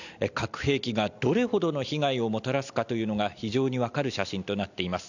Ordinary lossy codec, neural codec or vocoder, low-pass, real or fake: none; none; 7.2 kHz; real